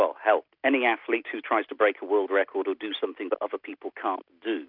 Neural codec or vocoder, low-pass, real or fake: none; 5.4 kHz; real